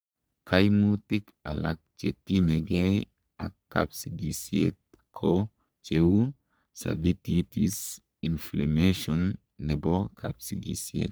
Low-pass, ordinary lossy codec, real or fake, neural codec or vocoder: none; none; fake; codec, 44.1 kHz, 3.4 kbps, Pupu-Codec